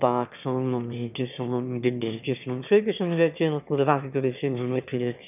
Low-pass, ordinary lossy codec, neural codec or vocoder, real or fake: 3.6 kHz; none; autoencoder, 22.05 kHz, a latent of 192 numbers a frame, VITS, trained on one speaker; fake